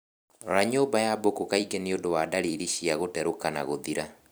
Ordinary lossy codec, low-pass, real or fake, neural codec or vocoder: none; none; fake; vocoder, 44.1 kHz, 128 mel bands every 256 samples, BigVGAN v2